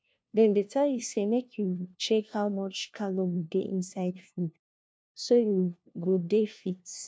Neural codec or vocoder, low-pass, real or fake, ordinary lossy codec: codec, 16 kHz, 1 kbps, FunCodec, trained on LibriTTS, 50 frames a second; none; fake; none